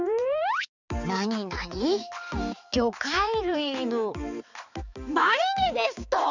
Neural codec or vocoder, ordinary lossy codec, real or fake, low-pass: codec, 16 kHz, 4 kbps, X-Codec, HuBERT features, trained on balanced general audio; none; fake; 7.2 kHz